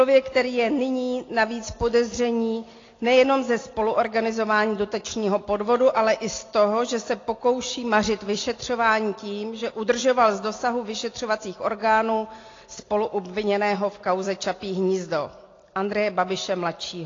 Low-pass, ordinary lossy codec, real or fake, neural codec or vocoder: 7.2 kHz; AAC, 32 kbps; real; none